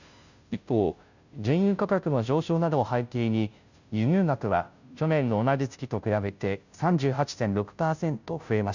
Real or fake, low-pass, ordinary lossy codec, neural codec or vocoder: fake; 7.2 kHz; none; codec, 16 kHz, 0.5 kbps, FunCodec, trained on Chinese and English, 25 frames a second